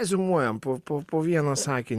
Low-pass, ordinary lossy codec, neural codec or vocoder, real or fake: 14.4 kHz; Opus, 64 kbps; none; real